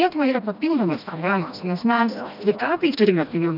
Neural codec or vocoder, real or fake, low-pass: codec, 16 kHz, 1 kbps, FreqCodec, smaller model; fake; 5.4 kHz